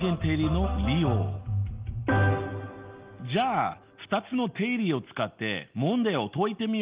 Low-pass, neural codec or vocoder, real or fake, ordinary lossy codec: 3.6 kHz; none; real; Opus, 32 kbps